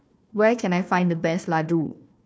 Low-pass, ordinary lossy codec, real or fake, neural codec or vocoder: none; none; fake; codec, 16 kHz, 1 kbps, FunCodec, trained on Chinese and English, 50 frames a second